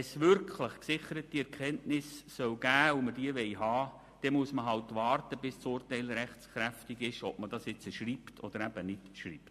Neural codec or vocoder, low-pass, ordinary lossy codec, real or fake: none; 14.4 kHz; MP3, 64 kbps; real